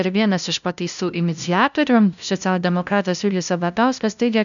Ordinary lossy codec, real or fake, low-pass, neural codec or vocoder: MP3, 96 kbps; fake; 7.2 kHz; codec, 16 kHz, 0.5 kbps, FunCodec, trained on LibriTTS, 25 frames a second